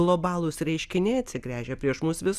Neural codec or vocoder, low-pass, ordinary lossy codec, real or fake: none; 14.4 kHz; Opus, 64 kbps; real